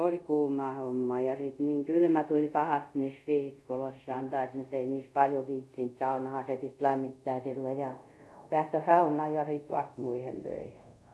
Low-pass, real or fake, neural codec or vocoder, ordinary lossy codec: none; fake; codec, 24 kHz, 0.5 kbps, DualCodec; none